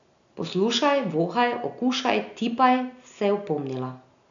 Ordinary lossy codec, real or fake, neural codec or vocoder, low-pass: none; real; none; 7.2 kHz